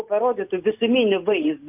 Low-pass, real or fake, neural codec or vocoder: 3.6 kHz; real; none